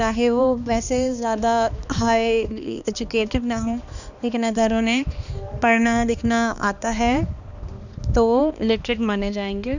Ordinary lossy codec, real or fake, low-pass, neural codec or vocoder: none; fake; 7.2 kHz; codec, 16 kHz, 2 kbps, X-Codec, HuBERT features, trained on balanced general audio